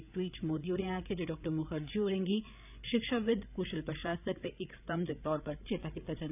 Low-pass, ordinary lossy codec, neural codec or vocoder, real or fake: 3.6 kHz; none; codec, 16 kHz, 8 kbps, FreqCodec, larger model; fake